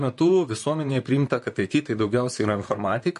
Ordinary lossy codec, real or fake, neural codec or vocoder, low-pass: MP3, 48 kbps; fake; vocoder, 44.1 kHz, 128 mel bands, Pupu-Vocoder; 14.4 kHz